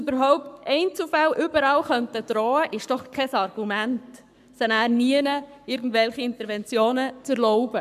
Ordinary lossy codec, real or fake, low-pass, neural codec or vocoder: none; fake; 14.4 kHz; codec, 44.1 kHz, 7.8 kbps, Pupu-Codec